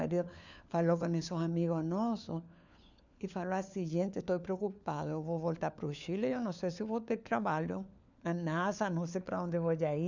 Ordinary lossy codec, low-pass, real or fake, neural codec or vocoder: none; 7.2 kHz; fake; codec, 16 kHz, 4 kbps, FunCodec, trained on LibriTTS, 50 frames a second